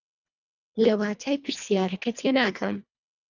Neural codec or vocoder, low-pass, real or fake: codec, 24 kHz, 1.5 kbps, HILCodec; 7.2 kHz; fake